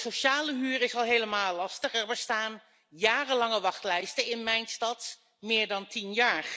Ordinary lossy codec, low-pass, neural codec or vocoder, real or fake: none; none; none; real